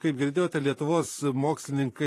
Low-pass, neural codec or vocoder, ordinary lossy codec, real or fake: 14.4 kHz; vocoder, 44.1 kHz, 128 mel bands, Pupu-Vocoder; AAC, 48 kbps; fake